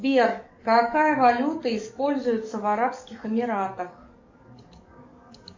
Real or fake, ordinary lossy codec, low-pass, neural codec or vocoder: fake; MP3, 48 kbps; 7.2 kHz; codec, 44.1 kHz, 7.8 kbps, DAC